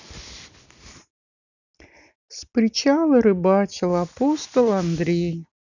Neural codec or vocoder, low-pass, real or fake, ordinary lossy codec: none; 7.2 kHz; real; none